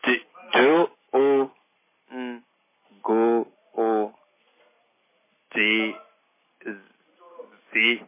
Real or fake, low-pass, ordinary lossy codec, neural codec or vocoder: real; 3.6 kHz; MP3, 16 kbps; none